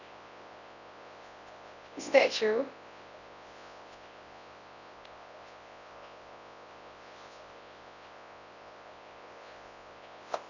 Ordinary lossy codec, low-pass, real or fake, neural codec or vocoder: none; 7.2 kHz; fake; codec, 24 kHz, 0.9 kbps, WavTokenizer, large speech release